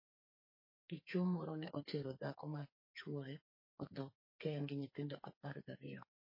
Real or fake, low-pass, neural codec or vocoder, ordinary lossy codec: fake; 5.4 kHz; codec, 44.1 kHz, 2.6 kbps, SNAC; MP3, 24 kbps